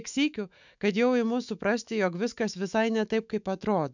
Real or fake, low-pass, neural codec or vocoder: fake; 7.2 kHz; autoencoder, 48 kHz, 128 numbers a frame, DAC-VAE, trained on Japanese speech